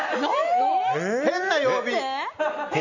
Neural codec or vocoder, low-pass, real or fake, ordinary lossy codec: none; 7.2 kHz; real; none